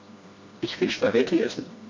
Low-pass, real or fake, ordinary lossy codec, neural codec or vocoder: 7.2 kHz; fake; MP3, 48 kbps; codec, 16 kHz, 1 kbps, FreqCodec, smaller model